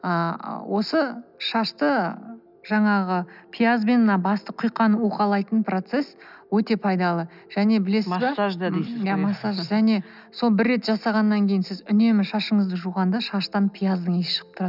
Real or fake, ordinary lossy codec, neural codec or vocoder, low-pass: real; none; none; 5.4 kHz